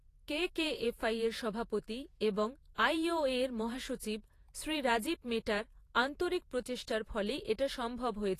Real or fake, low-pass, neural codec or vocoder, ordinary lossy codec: fake; 14.4 kHz; vocoder, 48 kHz, 128 mel bands, Vocos; AAC, 48 kbps